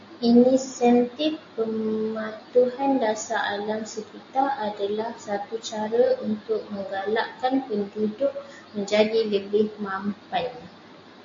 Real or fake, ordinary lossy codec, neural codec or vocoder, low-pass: real; AAC, 48 kbps; none; 7.2 kHz